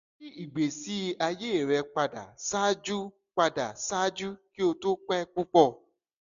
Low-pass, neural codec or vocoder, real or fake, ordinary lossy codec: 7.2 kHz; none; real; MP3, 64 kbps